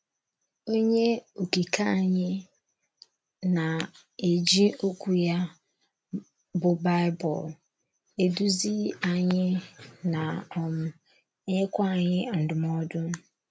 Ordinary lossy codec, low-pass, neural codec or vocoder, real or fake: none; none; none; real